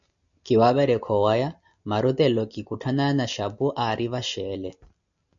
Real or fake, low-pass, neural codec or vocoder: real; 7.2 kHz; none